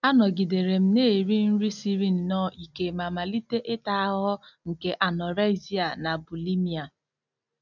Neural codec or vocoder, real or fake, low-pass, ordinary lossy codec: none; real; 7.2 kHz; none